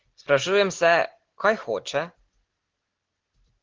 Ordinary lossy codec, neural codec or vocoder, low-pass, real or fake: Opus, 16 kbps; none; 7.2 kHz; real